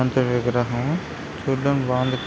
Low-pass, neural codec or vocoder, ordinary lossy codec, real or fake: none; none; none; real